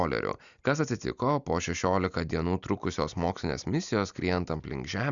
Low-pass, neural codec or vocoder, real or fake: 7.2 kHz; none; real